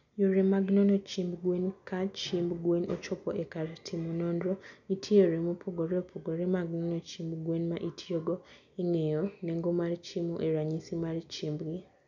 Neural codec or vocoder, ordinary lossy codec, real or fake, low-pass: none; none; real; 7.2 kHz